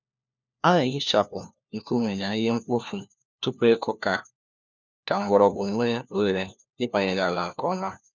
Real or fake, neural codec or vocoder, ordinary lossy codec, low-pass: fake; codec, 16 kHz, 1 kbps, FunCodec, trained on LibriTTS, 50 frames a second; none; 7.2 kHz